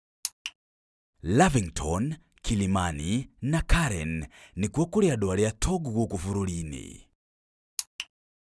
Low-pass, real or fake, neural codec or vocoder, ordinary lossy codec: none; real; none; none